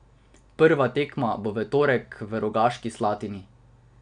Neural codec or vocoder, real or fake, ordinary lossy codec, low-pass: none; real; none; 9.9 kHz